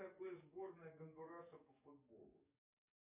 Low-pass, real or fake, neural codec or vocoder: 3.6 kHz; fake; codec, 44.1 kHz, 7.8 kbps, DAC